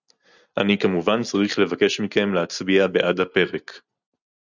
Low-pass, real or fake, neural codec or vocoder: 7.2 kHz; real; none